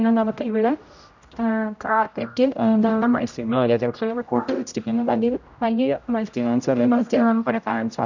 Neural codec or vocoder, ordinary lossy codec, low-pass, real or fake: codec, 16 kHz, 0.5 kbps, X-Codec, HuBERT features, trained on general audio; none; 7.2 kHz; fake